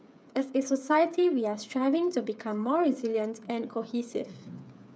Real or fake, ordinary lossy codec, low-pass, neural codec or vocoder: fake; none; none; codec, 16 kHz, 8 kbps, FreqCodec, smaller model